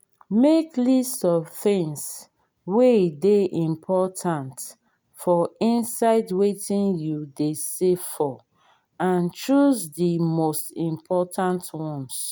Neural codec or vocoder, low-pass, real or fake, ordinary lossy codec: none; none; real; none